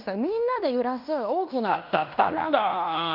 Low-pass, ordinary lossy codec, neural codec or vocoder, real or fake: 5.4 kHz; none; codec, 16 kHz in and 24 kHz out, 0.9 kbps, LongCat-Audio-Codec, fine tuned four codebook decoder; fake